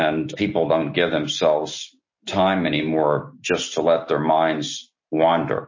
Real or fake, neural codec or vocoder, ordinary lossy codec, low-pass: real; none; MP3, 32 kbps; 7.2 kHz